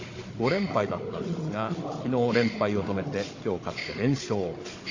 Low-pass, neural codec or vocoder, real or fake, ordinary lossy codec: 7.2 kHz; codec, 16 kHz, 16 kbps, FunCodec, trained on Chinese and English, 50 frames a second; fake; AAC, 32 kbps